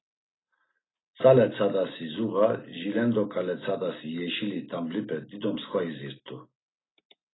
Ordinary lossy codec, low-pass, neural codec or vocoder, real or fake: AAC, 16 kbps; 7.2 kHz; none; real